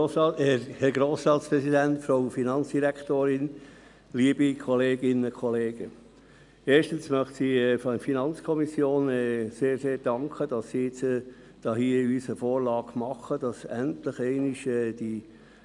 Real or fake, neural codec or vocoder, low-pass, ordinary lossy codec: real; none; 10.8 kHz; none